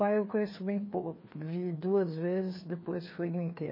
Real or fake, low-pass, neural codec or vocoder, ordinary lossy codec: fake; 5.4 kHz; codec, 16 kHz, 2 kbps, FreqCodec, larger model; MP3, 24 kbps